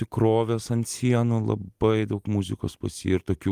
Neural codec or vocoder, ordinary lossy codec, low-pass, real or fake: vocoder, 44.1 kHz, 128 mel bands every 256 samples, BigVGAN v2; Opus, 32 kbps; 14.4 kHz; fake